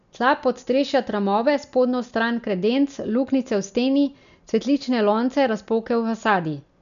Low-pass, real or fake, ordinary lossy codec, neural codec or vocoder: 7.2 kHz; real; none; none